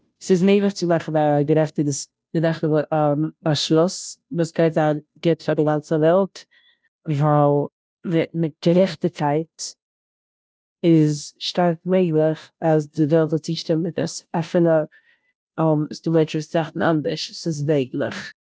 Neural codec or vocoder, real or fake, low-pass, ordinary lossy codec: codec, 16 kHz, 0.5 kbps, FunCodec, trained on Chinese and English, 25 frames a second; fake; none; none